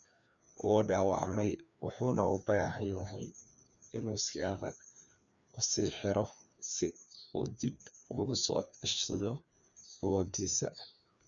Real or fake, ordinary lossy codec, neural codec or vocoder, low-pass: fake; none; codec, 16 kHz, 2 kbps, FreqCodec, larger model; 7.2 kHz